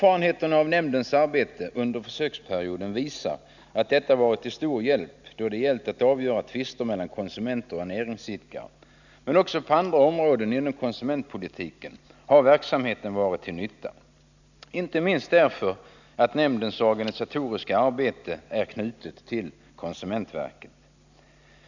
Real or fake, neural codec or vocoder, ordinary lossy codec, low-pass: real; none; none; 7.2 kHz